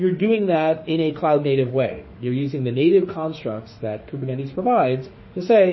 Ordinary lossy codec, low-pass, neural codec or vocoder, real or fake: MP3, 24 kbps; 7.2 kHz; autoencoder, 48 kHz, 32 numbers a frame, DAC-VAE, trained on Japanese speech; fake